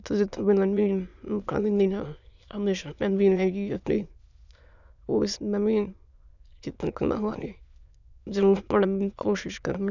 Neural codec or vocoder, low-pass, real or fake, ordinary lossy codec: autoencoder, 22.05 kHz, a latent of 192 numbers a frame, VITS, trained on many speakers; 7.2 kHz; fake; none